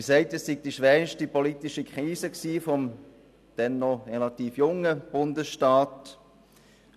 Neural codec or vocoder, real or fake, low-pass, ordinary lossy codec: none; real; 14.4 kHz; none